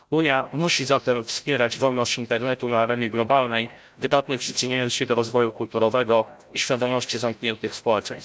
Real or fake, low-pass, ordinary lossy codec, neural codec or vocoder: fake; none; none; codec, 16 kHz, 0.5 kbps, FreqCodec, larger model